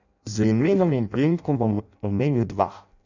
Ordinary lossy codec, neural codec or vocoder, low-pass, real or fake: none; codec, 16 kHz in and 24 kHz out, 0.6 kbps, FireRedTTS-2 codec; 7.2 kHz; fake